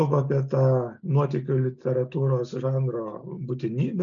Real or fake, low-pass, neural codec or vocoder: real; 7.2 kHz; none